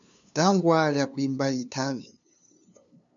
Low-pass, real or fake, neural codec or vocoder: 7.2 kHz; fake; codec, 16 kHz, 2 kbps, FunCodec, trained on LibriTTS, 25 frames a second